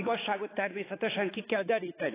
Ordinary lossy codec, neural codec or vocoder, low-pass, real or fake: AAC, 16 kbps; codec, 16 kHz, 4 kbps, X-Codec, HuBERT features, trained on balanced general audio; 3.6 kHz; fake